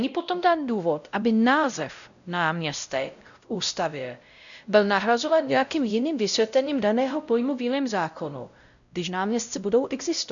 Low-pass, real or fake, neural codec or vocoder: 7.2 kHz; fake; codec, 16 kHz, 0.5 kbps, X-Codec, WavLM features, trained on Multilingual LibriSpeech